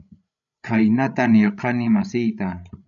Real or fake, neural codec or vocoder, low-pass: fake; codec, 16 kHz, 16 kbps, FreqCodec, larger model; 7.2 kHz